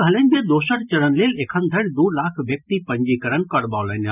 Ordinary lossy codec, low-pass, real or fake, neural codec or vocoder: none; 3.6 kHz; real; none